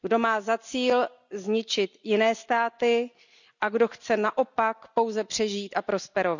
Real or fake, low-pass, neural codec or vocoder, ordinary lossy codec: real; 7.2 kHz; none; none